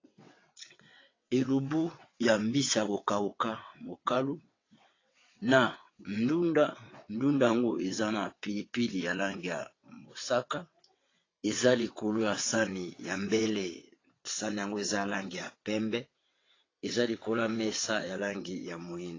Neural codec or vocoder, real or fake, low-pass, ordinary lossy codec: vocoder, 22.05 kHz, 80 mel bands, WaveNeXt; fake; 7.2 kHz; AAC, 32 kbps